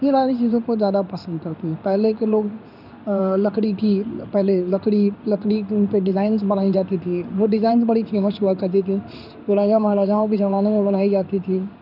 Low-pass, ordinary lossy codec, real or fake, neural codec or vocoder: 5.4 kHz; none; fake; codec, 16 kHz in and 24 kHz out, 1 kbps, XY-Tokenizer